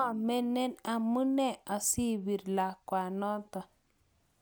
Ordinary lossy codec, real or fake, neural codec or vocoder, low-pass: none; real; none; none